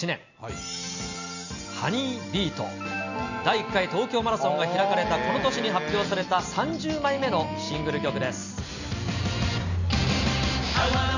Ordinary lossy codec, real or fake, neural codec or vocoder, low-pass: none; real; none; 7.2 kHz